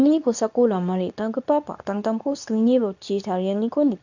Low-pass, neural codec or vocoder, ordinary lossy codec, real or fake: 7.2 kHz; codec, 24 kHz, 0.9 kbps, WavTokenizer, medium speech release version 2; none; fake